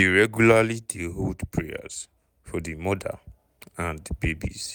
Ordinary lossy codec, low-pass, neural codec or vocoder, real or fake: none; none; none; real